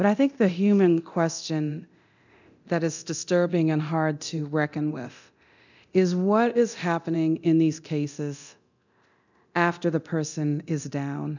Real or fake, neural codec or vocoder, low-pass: fake; codec, 24 kHz, 0.9 kbps, DualCodec; 7.2 kHz